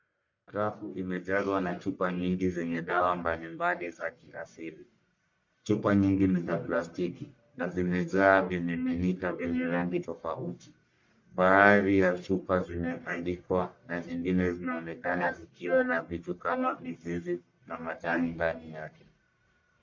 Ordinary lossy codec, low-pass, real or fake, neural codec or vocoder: MP3, 48 kbps; 7.2 kHz; fake; codec, 44.1 kHz, 1.7 kbps, Pupu-Codec